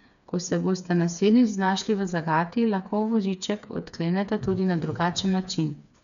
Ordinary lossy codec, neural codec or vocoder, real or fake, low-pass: none; codec, 16 kHz, 4 kbps, FreqCodec, smaller model; fake; 7.2 kHz